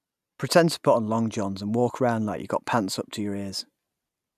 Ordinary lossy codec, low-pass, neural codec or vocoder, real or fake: none; 14.4 kHz; none; real